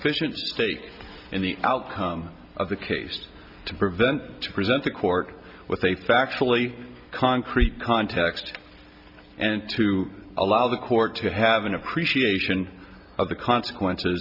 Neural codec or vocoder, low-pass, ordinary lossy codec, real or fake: none; 5.4 kHz; Opus, 64 kbps; real